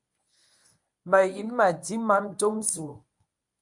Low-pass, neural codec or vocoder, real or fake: 10.8 kHz; codec, 24 kHz, 0.9 kbps, WavTokenizer, medium speech release version 1; fake